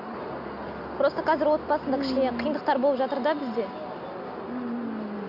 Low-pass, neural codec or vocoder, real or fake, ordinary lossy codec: 5.4 kHz; none; real; none